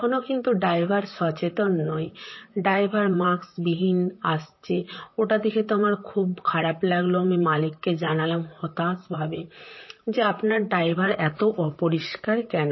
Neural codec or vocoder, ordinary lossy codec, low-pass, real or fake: vocoder, 44.1 kHz, 128 mel bands, Pupu-Vocoder; MP3, 24 kbps; 7.2 kHz; fake